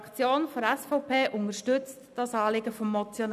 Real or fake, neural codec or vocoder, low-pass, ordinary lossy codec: real; none; 14.4 kHz; none